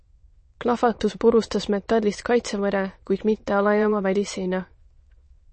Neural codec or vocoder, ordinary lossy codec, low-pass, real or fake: autoencoder, 22.05 kHz, a latent of 192 numbers a frame, VITS, trained on many speakers; MP3, 32 kbps; 9.9 kHz; fake